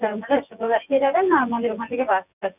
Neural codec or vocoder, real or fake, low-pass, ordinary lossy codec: vocoder, 24 kHz, 100 mel bands, Vocos; fake; 3.6 kHz; none